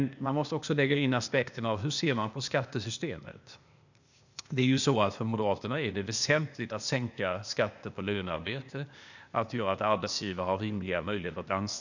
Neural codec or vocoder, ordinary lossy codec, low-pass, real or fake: codec, 16 kHz, 0.8 kbps, ZipCodec; none; 7.2 kHz; fake